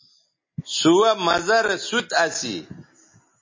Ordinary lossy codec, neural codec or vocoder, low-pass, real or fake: MP3, 32 kbps; none; 7.2 kHz; real